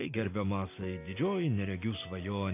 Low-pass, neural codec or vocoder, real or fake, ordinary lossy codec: 3.6 kHz; none; real; AAC, 24 kbps